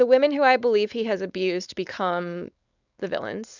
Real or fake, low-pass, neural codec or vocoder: fake; 7.2 kHz; codec, 16 kHz, 4.8 kbps, FACodec